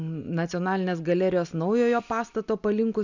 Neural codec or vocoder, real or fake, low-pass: none; real; 7.2 kHz